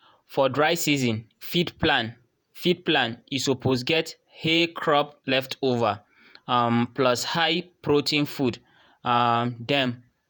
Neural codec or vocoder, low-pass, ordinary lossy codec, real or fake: vocoder, 48 kHz, 128 mel bands, Vocos; none; none; fake